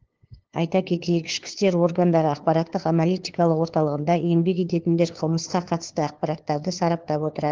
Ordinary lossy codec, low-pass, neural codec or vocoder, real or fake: Opus, 16 kbps; 7.2 kHz; codec, 16 kHz, 2 kbps, FunCodec, trained on LibriTTS, 25 frames a second; fake